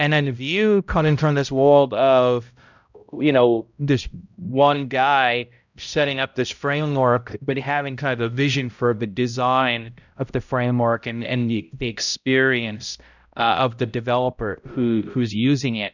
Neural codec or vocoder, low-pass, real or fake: codec, 16 kHz, 0.5 kbps, X-Codec, HuBERT features, trained on balanced general audio; 7.2 kHz; fake